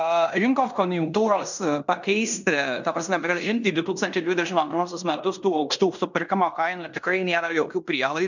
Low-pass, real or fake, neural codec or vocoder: 7.2 kHz; fake; codec, 16 kHz in and 24 kHz out, 0.9 kbps, LongCat-Audio-Codec, fine tuned four codebook decoder